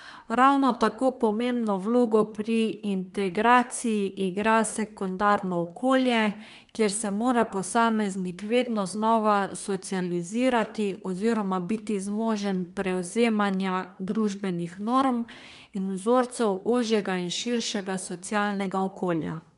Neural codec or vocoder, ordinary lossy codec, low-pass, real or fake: codec, 24 kHz, 1 kbps, SNAC; none; 10.8 kHz; fake